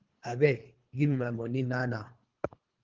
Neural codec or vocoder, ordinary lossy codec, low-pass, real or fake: codec, 24 kHz, 3 kbps, HILCodec; Opus, 16 kbps; 7.2 kHz; fake